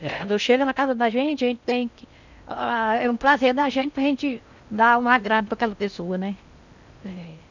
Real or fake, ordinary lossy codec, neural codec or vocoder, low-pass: fake; none; codec, 16 kHz in and 24 kHz out, 0.6 kbps, FocalCodec, streaming, 4096 codes; 7.2 kHz